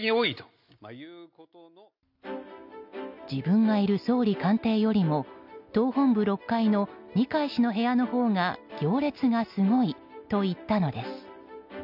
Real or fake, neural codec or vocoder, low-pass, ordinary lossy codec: real; none; 5.4 kHz; MP3, 48 kbps